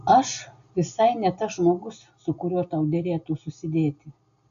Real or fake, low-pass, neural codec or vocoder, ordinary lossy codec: real; 7.2 kHz; none; MP3, 96 kbps